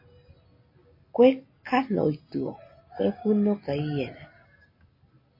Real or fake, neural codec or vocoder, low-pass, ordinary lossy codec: real; none; 5.4 kHz; MP3, 24 kbps